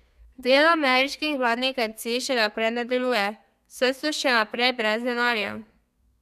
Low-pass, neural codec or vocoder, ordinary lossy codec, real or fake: 14.4 kHz; codec, 32 kHz, 1.9 kbps, SNAC; none; fake